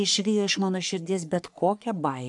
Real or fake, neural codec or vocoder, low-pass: fake; codec, 44.1 kHz, 3.4 kbps, Pupu-Codec; 10.8 kHz